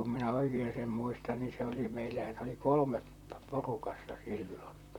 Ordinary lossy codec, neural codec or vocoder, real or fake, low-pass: none; vocoder, 44.1 kHz, 128 mel bands, Pupu-Vocoder; fake; 19.8 kHz